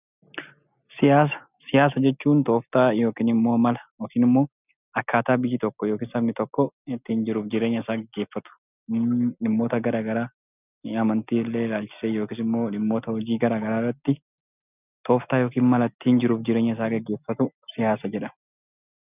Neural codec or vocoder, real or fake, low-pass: none; real; 3.6 kHz